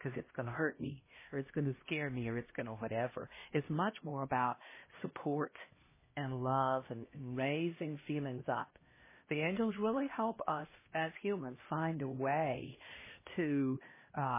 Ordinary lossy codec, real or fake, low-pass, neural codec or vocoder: MP3, 16 kbps; fake; 3.6 kHz; codec, 16 kHz, 1 kbps, X-Codec, HuBERT features, trained on LibriSpeech